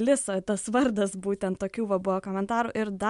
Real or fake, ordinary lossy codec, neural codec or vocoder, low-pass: real; MP3, 64 kbps; none; 10.8 kHz